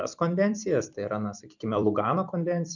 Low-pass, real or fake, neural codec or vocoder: 7.2 kHz; fake; vocoder, 44.1 kHz, 128 mel bands every 256 samples, BigVGAN v2